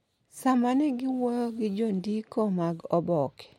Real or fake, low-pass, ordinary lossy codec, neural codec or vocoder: real; 19.8 kHz; MP3, 64 kbps; none